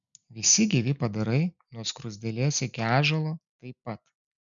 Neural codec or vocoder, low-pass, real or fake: none; 7.2 kHz; real